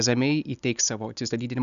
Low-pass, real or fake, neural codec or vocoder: 7.2 kHz; real; none